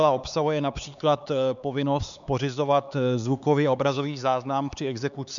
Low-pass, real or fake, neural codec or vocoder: 7.2 kHz; fake; codec, 16 kHz, 4 kbps, X-Codec, HuBERT features, trained on LibriSpeech